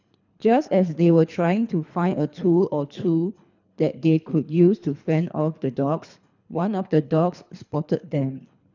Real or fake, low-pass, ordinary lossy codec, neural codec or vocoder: fake; 7.2 kHz; none; codec, 24 kHz, 3 kbps, HILCodec